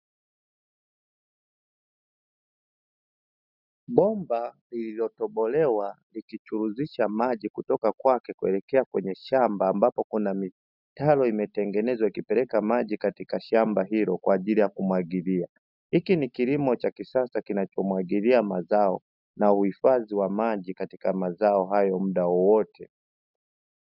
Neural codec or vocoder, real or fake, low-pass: none; real; 5.4 kHz